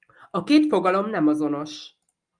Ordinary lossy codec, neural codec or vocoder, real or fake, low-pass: Opus, 32 kbps; none; real; 9.9 kHz